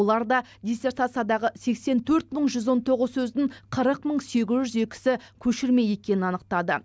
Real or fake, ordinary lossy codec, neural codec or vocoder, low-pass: real; none; none; none